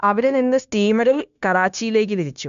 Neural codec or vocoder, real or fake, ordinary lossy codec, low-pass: codec, 16 kHz, 0.9 kbps, LongCat-Audio-Codec; fake; none; 7.2 kHz